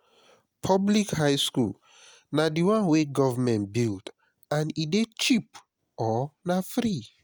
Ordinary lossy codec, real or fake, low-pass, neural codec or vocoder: none; real; none; none